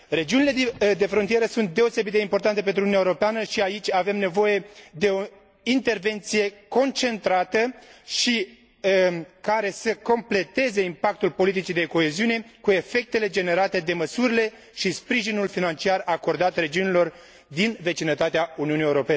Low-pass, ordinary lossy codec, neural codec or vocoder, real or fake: none; none; none; real